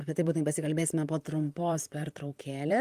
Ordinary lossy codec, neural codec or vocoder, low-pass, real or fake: Opus, 32 kbps; vocoder, 44.1 kHz, 128 mel bands, Pupu-Vocoder; 14.4 kHz; fake